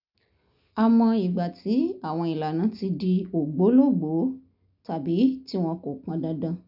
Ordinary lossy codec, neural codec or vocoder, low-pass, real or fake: none; none; 5.4 kHz; real